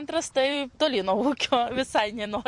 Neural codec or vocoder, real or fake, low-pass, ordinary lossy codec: vocoder, 44.1 kHz, 128 mel bands every 256 samples, BigVGAN v2; fake; 10.8 kHz; MP3, 48 kbps